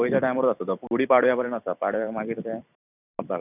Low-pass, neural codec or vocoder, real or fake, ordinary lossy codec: 3.6 kHz; vocoder, 44.1 kHz, 128 mel bands every 256 samples, BigVGAN v2; fake; none